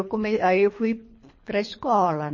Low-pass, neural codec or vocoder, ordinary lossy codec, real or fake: 7.2 kHz; codec, 24 kHz, 3 kbps, HILCodec; MP3, 32 kbps; fake